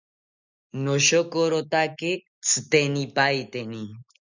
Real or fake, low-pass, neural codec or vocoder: real; 7.2 kHz; none